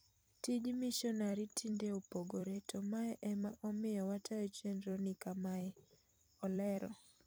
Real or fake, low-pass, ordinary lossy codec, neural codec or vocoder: fake; none; none; vocoder, 44.1 kHz, 128 mel bands every 256 samples, BigVGAN v2